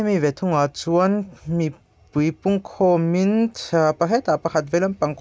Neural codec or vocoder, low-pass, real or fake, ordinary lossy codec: none; none; real; none